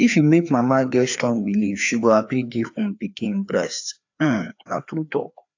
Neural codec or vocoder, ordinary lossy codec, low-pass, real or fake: codec, 16 kHz, 2 kbps, FreqCodec, larger model; AAC, 48 kbps; 7.2 kHz; fake